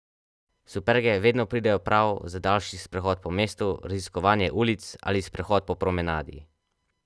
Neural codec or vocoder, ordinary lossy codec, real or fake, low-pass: none; none; real; none